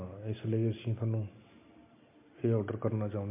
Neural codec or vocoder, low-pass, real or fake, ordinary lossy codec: none; 3.6 kHz; real; MP3, 24 kbps